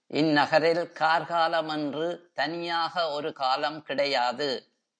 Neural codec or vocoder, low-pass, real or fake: none; 9.9 kHz; real